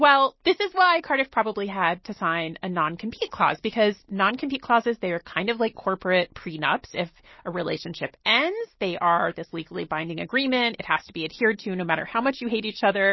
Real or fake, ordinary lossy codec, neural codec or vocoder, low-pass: real; MP3, 24 kbps; none; 7.2 kHz